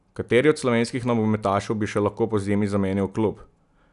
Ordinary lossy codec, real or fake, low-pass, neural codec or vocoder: none; real; 10.8 kHz; none